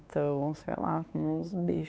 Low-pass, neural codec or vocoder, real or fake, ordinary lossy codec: none; codec, 16 kHz, 4 kbps, X-Codec, WavLM features, trained on Multilingual LibriSpeech; fake; none